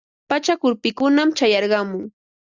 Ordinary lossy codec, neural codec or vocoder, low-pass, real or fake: Opus, 64 kbps; none; 7.2 kHz; real